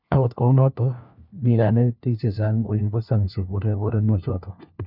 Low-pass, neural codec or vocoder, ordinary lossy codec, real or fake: 5.4 kHz; codec, 16 kHz, 1 kbps, FunCodec, trained on LibriTTS, 50 frames a second; none; fake